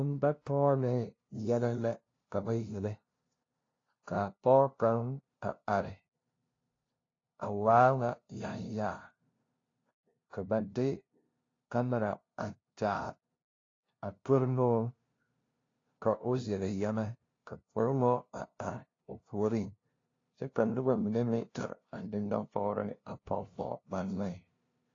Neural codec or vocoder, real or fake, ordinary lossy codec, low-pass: codec, 16 kHz, 0.5 kbps, FunCodec, trained on LibriTTS, 25 frames a second; fake; AAC, 32 kbps; 7.2 kHz